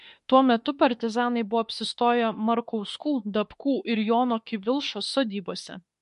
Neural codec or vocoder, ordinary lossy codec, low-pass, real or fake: autoencoder, 48 kHz, 32 numbers a frame, DAC-VAE, trained on Japanese speech; MP3, 48 kbps; 14.4 kHz; fake